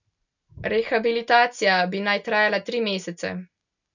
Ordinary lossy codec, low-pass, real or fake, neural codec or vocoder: none; 7.2 kHz; real; none